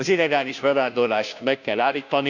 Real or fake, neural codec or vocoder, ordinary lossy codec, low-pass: fake; codec, 16 kHz, 0.5 kbps, FunCodec, trained on Chinese and English, 25 frames a second; none; 7.2 kHz